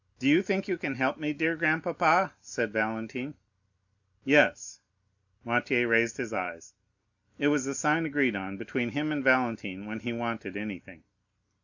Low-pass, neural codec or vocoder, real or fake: 7.2 kHz; none; real